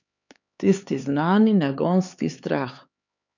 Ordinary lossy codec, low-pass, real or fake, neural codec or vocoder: none; 7.2 kHz; fake; codec, 16 kHz, 4 kbps, X-Codec, HuBERT features, trained on LibriSpeech